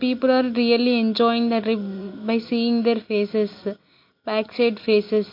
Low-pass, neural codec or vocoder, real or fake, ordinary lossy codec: 5.4 kHz; none; real; MP3, 32 kbps